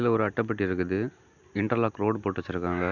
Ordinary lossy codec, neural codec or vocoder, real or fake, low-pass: none; none; real; 7.2 kHz